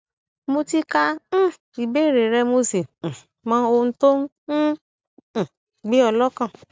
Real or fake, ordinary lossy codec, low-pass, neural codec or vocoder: real; none; none; none